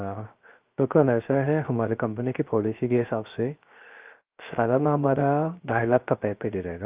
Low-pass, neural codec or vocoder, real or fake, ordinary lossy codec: 3.6 kHz; codec, 16 kHz, 0.3 kbps, FocalCodec; fake; Opus, 24 kbps